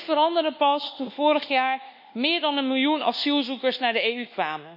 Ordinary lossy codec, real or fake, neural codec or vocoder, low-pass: none; fake; codec, 24 kHz, 1.2 kbps, DualCodec; 5.4 kHz